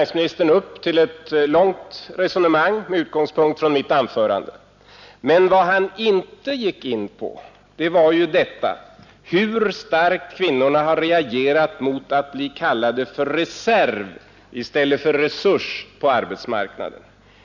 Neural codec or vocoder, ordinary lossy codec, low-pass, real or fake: none; none; 7.2 kHz; real